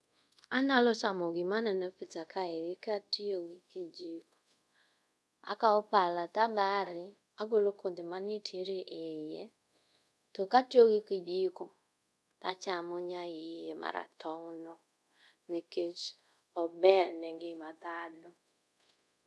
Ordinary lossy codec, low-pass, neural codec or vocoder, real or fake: none; none; codec, 24 kHz, 0.5 kbps, DualCodec; fake